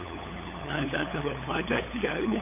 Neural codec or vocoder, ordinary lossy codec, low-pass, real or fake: codec, 16 kHz, 8 kbps, FunCodec, trained on LibriTTS, 25 frames a second; none; 3.6 kHz; fake